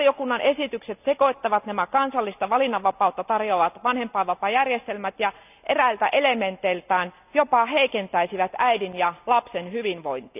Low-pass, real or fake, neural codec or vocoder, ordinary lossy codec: 3.6 kHz; real; none; none